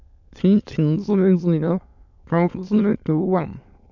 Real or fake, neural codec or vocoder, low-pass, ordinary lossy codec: fake; autoencoder, 22.05 kHz, a latent of 192 numbers a frame, VITS, trained on many speakers; 7.2 kHz; none